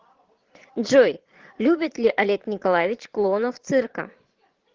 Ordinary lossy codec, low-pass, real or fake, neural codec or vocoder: Opus, 16 kbps; 7.2 kHz; fake; vocoder, 22.05 kHz, 80 mel bands, Vocos